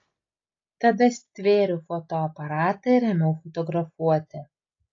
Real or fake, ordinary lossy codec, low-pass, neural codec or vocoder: real; AAC, 32 kbps; 7.2 kHz; none